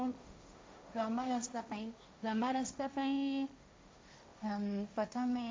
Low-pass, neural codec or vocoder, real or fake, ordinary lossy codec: none; codec, 16 kHz, 1.1 kbps, Voila-Tokenizer; fake; none